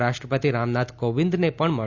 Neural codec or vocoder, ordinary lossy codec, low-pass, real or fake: none; none; 7.2 kHz; real